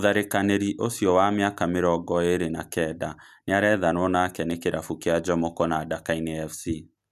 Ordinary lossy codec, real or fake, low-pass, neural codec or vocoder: none; real; 14.4 kHz; none